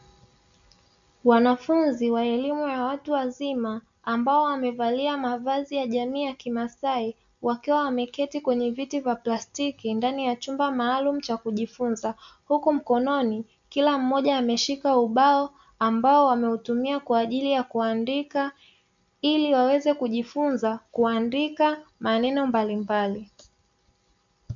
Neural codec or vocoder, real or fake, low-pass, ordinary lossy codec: none; real; 7.2 kHz; MP3, 64 kbps